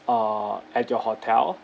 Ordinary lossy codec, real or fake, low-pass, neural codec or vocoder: none; real; none; none